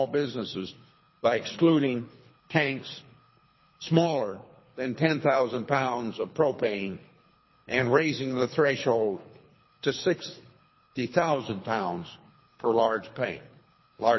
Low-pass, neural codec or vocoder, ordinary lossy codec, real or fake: 7.2 kHz; codec, 24 kHz, 3 kbps, HILCodec; MP3, 24 kbps; fake